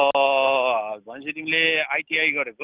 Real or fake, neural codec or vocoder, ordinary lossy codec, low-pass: real; none; Opus, 32 kbps; 3.6 kHz